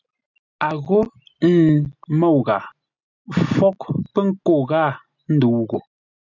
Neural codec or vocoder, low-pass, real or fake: none; 7.2 kHz; real